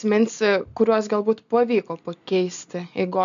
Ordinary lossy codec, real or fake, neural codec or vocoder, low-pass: MP3, 64 kbps; real; none; 7.2 kHz